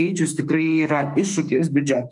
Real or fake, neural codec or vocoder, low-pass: fake; autoencoder, 48 kHz, 32 numbers a frame, DAC-VAE, trained on Japanese speech; 10.8 kHz